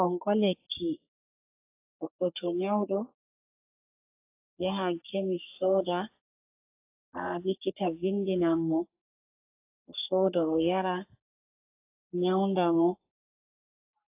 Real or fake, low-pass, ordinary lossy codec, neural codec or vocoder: fake; 3.6 kHz; AAC, 24 kbps; codec, 44.1 kHz, 3.4 kbps, Pupu-Codec